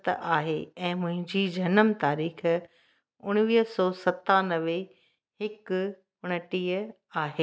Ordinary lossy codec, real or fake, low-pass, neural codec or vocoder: none; real; none; none